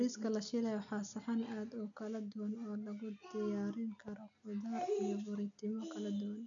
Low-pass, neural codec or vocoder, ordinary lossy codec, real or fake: 7.2 kHz; none; none; real